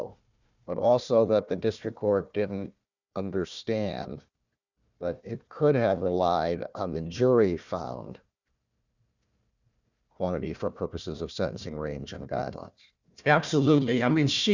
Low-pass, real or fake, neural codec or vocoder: 7.2 kHz; fake; codec, 16 kHz, 1 kbps, FunCodec, trained on Chinese and English, 50 frames a second